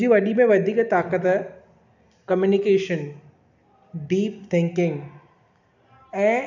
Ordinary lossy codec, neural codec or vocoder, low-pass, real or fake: none; none; 7.2 kHz; real